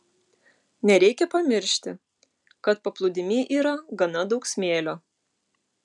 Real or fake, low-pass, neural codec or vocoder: real; 10.8 kHz; none